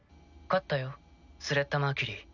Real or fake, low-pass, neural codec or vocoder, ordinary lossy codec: real; 7.2 kHz; none; none